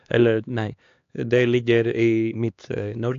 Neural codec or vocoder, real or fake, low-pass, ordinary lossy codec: codec, 16 kHz, 2 kbps, X-Codec, HuBERT features, trained on LibriSpeech; fake; 7.2 kHz; AAC, 64 kbps